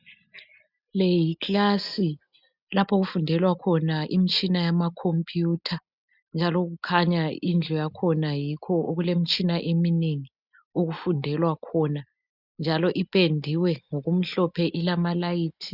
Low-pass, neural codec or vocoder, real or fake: 5.4 kHz; none; real